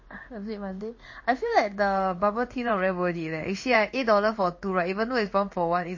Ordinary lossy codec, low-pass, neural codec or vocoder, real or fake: MP3, 32 kbps; 7.2 kHz; codec, 16 kHz in and 24 kHz out, 1 kbps, XY-Tokenizer; fake